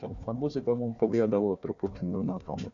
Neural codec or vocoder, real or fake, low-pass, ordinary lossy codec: codec, 16 kHz, 1 kbps, FunCodec, trained on Chinese and English, 50 frames a second; fake; 7.2 kHz; MP3, 96 kbps